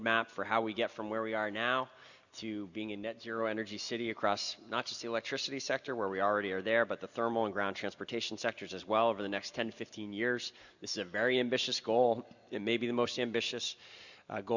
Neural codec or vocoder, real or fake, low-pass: none; real; 7.2 kHz